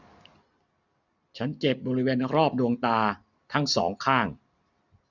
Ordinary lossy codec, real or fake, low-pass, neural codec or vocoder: none; real; 7.2 kHz; none